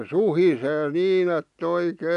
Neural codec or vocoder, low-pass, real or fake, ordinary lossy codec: none; 10.8 kHz; real; none